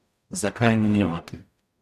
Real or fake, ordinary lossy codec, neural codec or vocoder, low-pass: fake; none; codec, 44.1 kHz, 0.9 kbps, DAC; 14.4 kHz